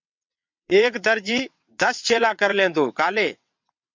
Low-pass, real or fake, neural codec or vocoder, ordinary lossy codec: 7.2 kHz; fake; vocoder, 22.05 kHz, 80 mel bands, WaveNeXt; MP3, 64 kbps